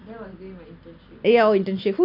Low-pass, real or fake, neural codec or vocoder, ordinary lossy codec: 5.4 kHz; real; none; AAC, 32 kbps